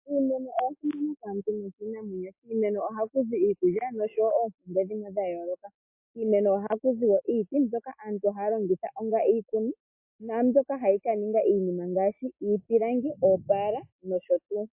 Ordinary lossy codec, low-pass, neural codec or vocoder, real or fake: MP3, 24 kbps; 3.6 kHz; none; real